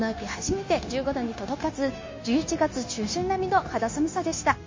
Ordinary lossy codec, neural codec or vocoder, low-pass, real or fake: MP3, 32 kbps; codec, 16 kHz, 0.9 kbps, LongCat-Audio-Codec; 7.2 kHz; fake